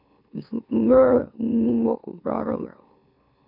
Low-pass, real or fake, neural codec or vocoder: 5.4 kHz; fake; autoencoder, 44.1 kHz, a latent of 192 numbers a frame, MeloTTS